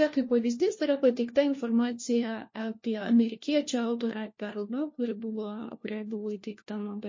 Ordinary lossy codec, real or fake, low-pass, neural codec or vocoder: MP3, 32 kbps; fake; 7.2 kHz; codec, 16 kHz, 1 kbps, FunCodec, trained on LibriTTS, 50 frames a second